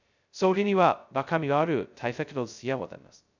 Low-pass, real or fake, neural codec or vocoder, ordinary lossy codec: 7.2 kHz; fake; codec, 16 kHz, 0.2 kbps, FocalCodec; none